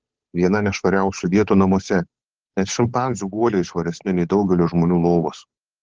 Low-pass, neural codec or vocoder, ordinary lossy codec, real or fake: 7.2 kHz; codec, 16 kHz, 8 kbps, FunCodec, trained on Chinese and English, 25 frames a second; Opus, 16 kbps; fake